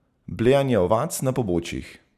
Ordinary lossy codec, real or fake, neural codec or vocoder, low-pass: none; real; none; 14.4 kHz